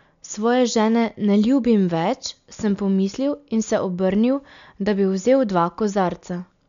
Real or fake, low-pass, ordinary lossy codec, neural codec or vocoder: real; 7.2 kHz; none; none